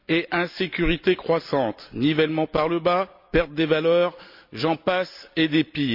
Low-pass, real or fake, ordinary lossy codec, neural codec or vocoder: 5.4 kHz; real; MP3, 48 kbps; none